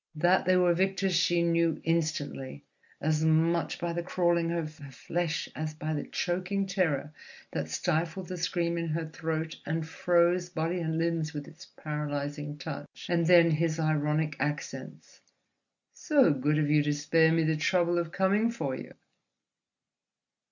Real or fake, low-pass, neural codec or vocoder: real; 7.2 kHz; none